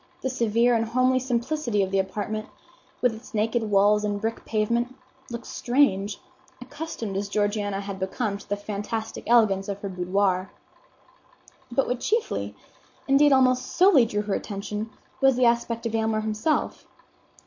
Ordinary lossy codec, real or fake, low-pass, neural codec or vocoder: MP3, 48 kbps; real; 7.2 kHz; none